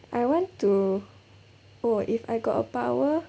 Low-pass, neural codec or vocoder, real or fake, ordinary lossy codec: none; none; real; none